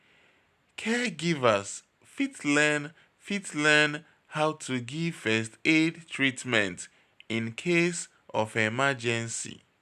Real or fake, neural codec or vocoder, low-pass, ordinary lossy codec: real; none; 14.4 kHz; none